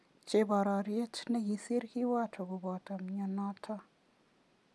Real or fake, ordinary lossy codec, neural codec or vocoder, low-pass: real; none; none; none